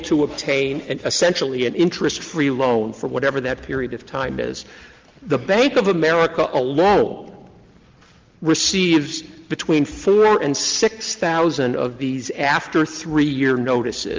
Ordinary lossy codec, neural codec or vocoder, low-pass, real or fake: Opus, 32 kbps; none; 7.2 kHz; real